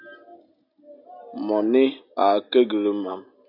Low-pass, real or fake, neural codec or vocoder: 5.4 kHz; real; none